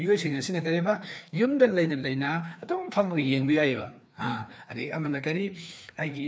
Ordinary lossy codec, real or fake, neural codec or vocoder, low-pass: none; fake; codec, 16 kHz, 2 kbps, FreqCodec, larger model; none